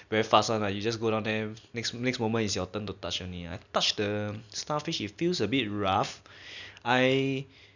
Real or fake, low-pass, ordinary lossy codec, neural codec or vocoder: real; 7.2 kHz; none; none